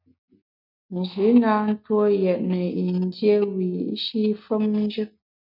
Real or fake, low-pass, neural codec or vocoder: real; 5.4 kHz; none